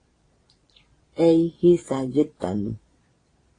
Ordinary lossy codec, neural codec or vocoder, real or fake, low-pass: AAC, 32 kbps; vocoder, 22.05 kHz, 80 mel bands, Vocos; fake; 9.9 kHz